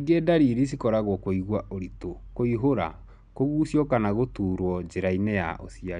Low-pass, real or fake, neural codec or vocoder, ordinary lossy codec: 9.9 kHz; real; none; none